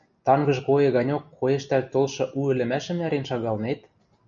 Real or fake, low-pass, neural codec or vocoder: real; 7.2 kHz; none